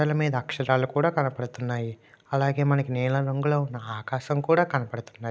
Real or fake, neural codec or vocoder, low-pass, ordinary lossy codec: real; none; none; none